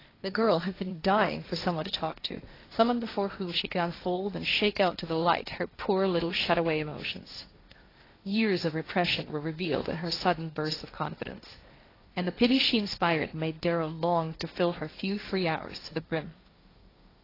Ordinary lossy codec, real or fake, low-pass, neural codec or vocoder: AAC, 24 kbps; fake; 5.4 kHz; codec, 16 kHz, 1.1 kbps, Voila-Tokenizer